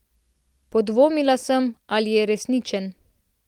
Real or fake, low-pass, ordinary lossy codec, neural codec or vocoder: real; 19.8 kHz; Opus, 24 kbps; none